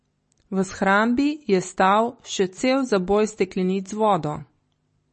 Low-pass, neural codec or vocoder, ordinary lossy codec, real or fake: 10.8 kHz; none; MP3, 32 kbps; real